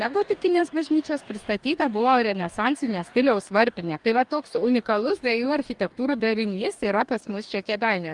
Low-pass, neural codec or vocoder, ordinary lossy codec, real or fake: 10.8 kHz; codec, 44.1 kHz, 2.6 kbps, DAC; Opus, 32 kbps; fake